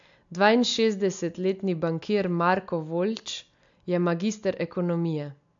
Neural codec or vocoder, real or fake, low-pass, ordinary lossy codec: none; real; 7.2 kHz; AAC, 64 kbps